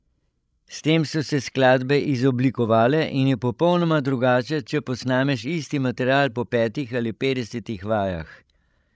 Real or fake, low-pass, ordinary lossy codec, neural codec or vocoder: fake; none; none; codec, 16 kHz, 16 kbps, FreqCodec, larger model